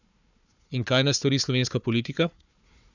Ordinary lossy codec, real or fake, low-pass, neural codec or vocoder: none; fake; 7.2 kHz; codec, 16 kHz, 4 kbps, FunCodec, trained on Chinese and English, 50 frames a second